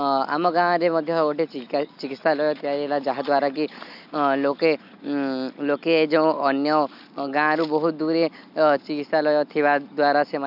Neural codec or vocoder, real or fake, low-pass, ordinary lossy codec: none; real; 5.4 kHz; none